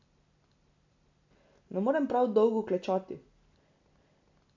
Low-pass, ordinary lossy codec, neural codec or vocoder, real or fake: 7.2 kHz; MP3, 64 kbps; none; real